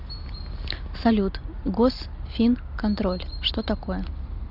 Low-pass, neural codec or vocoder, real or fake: 5.4 kHz; none; real